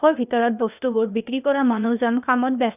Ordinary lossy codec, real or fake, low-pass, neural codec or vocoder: none; fake; 3.6 kHz; codec, 16 kHz, 0.8 kbps, ZipCodec